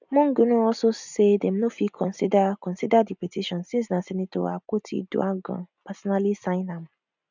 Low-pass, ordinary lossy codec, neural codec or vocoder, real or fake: 7.2 kHz; none; none; real